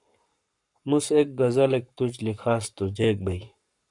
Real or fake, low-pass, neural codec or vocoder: fake; 10.8 kHz; codec, 44.1 kHz, 7.8 kbps, Pupu-Codec